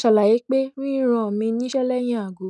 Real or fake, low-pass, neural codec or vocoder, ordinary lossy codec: real; 10.8 kHz; none; none